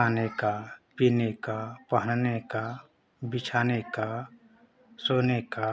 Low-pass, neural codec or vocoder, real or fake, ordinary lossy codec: none; none; real; none